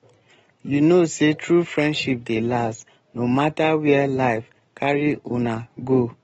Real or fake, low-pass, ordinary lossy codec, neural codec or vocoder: real; 10.8 kHz; AAC, 24 kbps; none